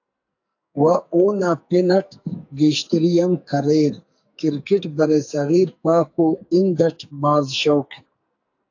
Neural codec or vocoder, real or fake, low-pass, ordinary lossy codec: codec, 44.1 kHz, 2.6 kbps, SNAC; fake; 7.2 kHz; AAC, 48 kbps